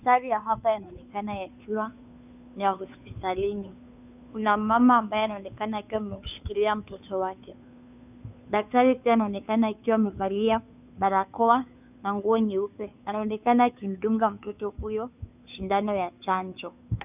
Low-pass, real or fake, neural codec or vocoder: 3.6 kHz; fake; codec, 16 kHz, 2 kbps, FunCodec, trained on Chinese and English, 25 frames a second